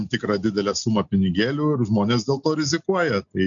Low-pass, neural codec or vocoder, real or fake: 7.2 kHz; none; real